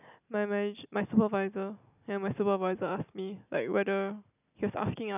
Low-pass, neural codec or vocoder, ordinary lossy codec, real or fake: 3.6 kHz; none; none; real